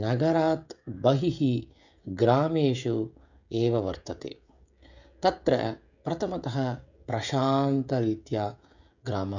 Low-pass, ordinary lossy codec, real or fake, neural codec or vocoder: 7.2 kHz; none; fake; codec, 16 kHz, 16 kbps, FreqCodec, smaller model